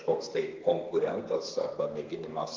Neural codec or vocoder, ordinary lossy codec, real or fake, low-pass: codec, 32 kHz, 1.9 kbps, SNAC; Opus, 24 kbps; fake; 7.2 kHz